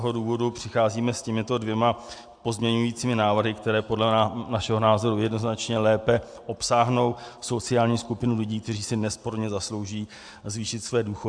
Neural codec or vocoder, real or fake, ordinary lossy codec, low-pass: none; real; AAC, 64 kbps; 9.9 kHz